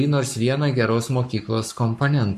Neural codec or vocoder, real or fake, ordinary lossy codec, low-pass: codec, 44.1 kHz, 7.8 kbps, Pupu-Codec; fake; MP3, 64 kbps; 14.4 kHz